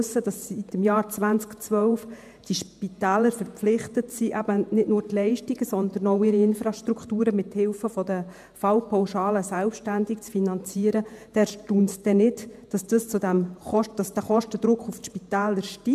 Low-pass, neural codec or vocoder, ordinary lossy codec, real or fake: 14.4 kHz; vocoder, 48 kHz, 128 mel bands, Vocos; MP3, 96 kbps; fake